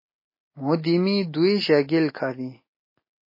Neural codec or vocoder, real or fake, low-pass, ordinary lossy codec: none; real; 5.4 kHz; MP3, 24 kbps